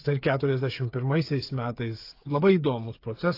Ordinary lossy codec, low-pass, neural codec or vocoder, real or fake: AAC, 32 kbps; 5.4 kHz; codec, 16 kHz, 8 kbps, FreqCodec, smaller model; fake